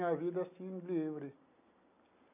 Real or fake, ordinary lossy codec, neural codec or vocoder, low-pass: real; none; none; 3.6 kHz